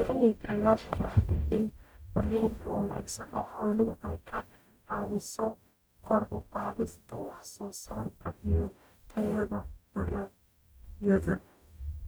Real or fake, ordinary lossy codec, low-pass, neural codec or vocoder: fake; none; none; codec, 44.1 kHz, 0.9 kbps, DAC